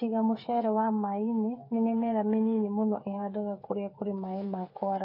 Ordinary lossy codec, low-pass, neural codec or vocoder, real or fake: MP3, 32 kbps; 5.4 kHz; codec, 16 kHz, 8 kbps, FreqCodec, smaller model; fake